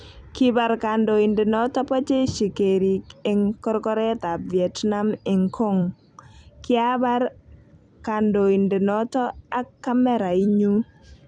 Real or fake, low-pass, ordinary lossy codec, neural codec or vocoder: fake; 9.9 kHz; none; vocoder, 44.1 kHz, 128 mel bands every 256 samples, BigVGAN v2